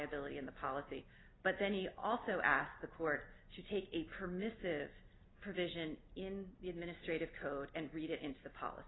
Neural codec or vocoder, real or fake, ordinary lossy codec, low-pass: none; real; AAC, 16 kbps; 7.2 kHz